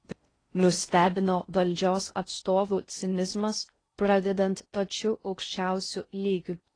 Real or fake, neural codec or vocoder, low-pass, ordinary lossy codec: fake; codec, 16 kHz in and 24 kHz out, 0.6 kbps, FocalCodec, streaming, 4096 codes; 9.9 kHz; AAC, 32 kbps